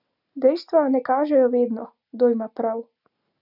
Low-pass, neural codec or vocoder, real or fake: 5.4 kHz; none; real